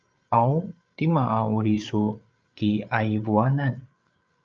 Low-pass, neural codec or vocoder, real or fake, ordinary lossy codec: 7.2 kHz; codec, 16 kHz, 8 kbps, FreqCodec, larger model; fake; Opus, 24 kbps